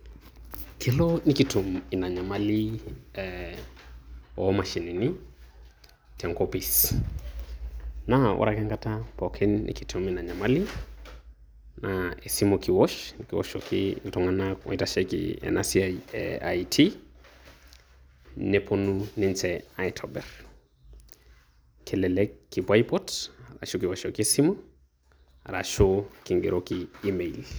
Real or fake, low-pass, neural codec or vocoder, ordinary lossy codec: real; none; none; none